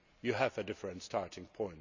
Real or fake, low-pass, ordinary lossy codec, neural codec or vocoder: real; 7.2 kHz; none; none